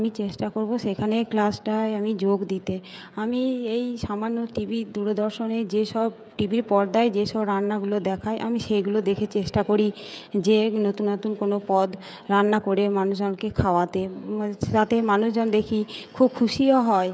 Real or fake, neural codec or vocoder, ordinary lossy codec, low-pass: fake; codec, 16 kHz, 16 kbps, FreqCodec, smaller model; none; none